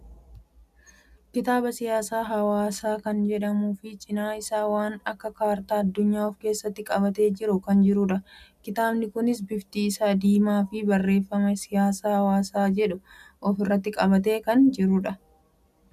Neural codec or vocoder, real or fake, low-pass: none; real; 14.4 kHz